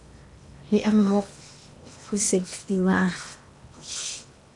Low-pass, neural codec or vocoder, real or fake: 10.8 kHz; codec, 16 kHz in and 24 kHz out, 0.8 kbps, FocalCodec, streaming, 65536 codes; fake